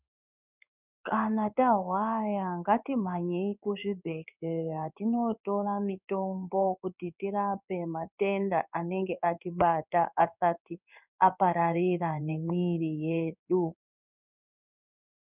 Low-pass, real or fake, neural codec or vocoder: 3.6 kHz; fake; codec, 16 kHz in and 24 kHz out, 1 kbps, XY-Tokenizer